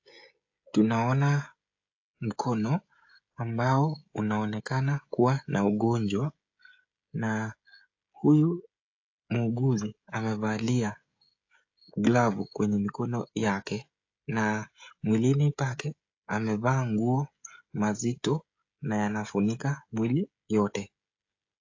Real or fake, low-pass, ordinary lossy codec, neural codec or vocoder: fake; 7.2 kHz; AAC, 48 kbps; codec, 16 kHz, 16 kbps, FreqCodec, smaller model